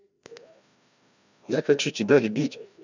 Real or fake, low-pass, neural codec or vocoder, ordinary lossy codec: fake; 7.2 kHz; codec, 16 kHz, 1 kbps, FreqCodec, larger model; none